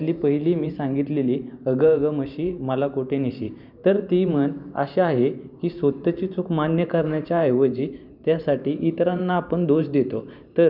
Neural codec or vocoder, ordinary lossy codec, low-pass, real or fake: vocoder, 44.1 kHz, 128 mel bands every 512 samples, BigVGAN v2; none; 5.4 kHz; fake